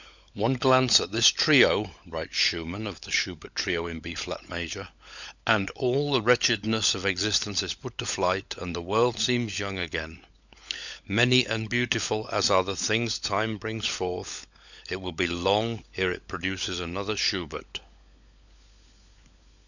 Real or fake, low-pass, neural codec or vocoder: fake; 7.2 kHz; codec, 16 kHz, 16 kbps, FunCodec, trained on LibriTTS, 50 frames a second